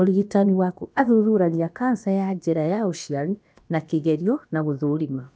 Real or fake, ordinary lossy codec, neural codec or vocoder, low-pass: fake; none; codec, 16 kHz, about 1 kbps, DyCAST, with the encoder's durations; none